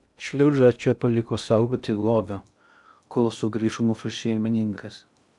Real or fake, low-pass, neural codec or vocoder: fake; 10.8 kHz; codec, 16 kHz in and 24 kHz out, 0.6 kbps, FocalCodec, streaming, 2048 codes